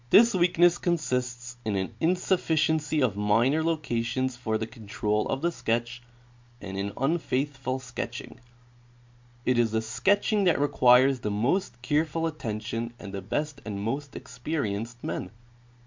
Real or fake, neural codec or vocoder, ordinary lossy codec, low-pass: real; none; MP3, 64 kbps; 7.2 kHz